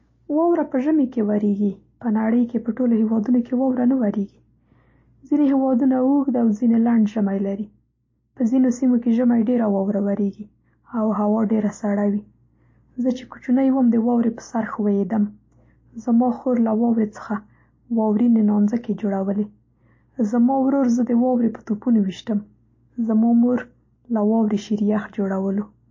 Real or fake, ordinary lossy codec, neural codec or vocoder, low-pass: real; MP3, 32 kbps; none; 7.2 kHz